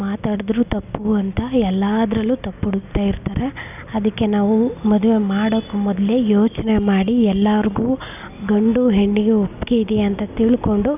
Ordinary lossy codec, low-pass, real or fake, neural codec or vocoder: none; 3.6 kHz; real; none